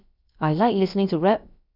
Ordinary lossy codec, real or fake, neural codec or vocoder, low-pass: none; fake; codec, 16 kHz, about 1 kbps, DyCAST, with the encoder's durations; 5.4 kHz